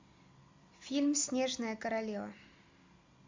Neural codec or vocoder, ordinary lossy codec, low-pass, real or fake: none; MP3, 64 kbps; 7.2 kHz; real